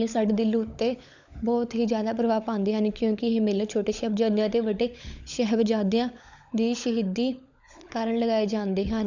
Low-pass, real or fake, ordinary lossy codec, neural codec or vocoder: 7.2 kHz; fake; none; codec, 16 kHz, 16 kbps, FunCodec, trained on LibriTTS, 50 frames a second